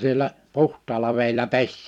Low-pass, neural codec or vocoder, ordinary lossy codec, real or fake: 19.8 kHz; vocoder, 44.1 kHz, 128 mel bands every 256 samples, BigVGAN v2; none; fake